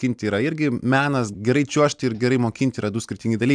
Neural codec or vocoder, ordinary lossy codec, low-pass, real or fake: none; MP3, 96 kbps; 9.9 kHz; real